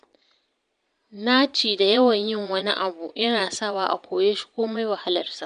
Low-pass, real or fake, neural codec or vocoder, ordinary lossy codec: 9.9 kHz; fake; vocoder, 22.05 kHz, 80 mel bands, Vocos; none